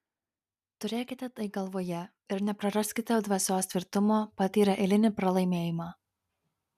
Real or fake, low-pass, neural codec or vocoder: real; 14.4 kHz; none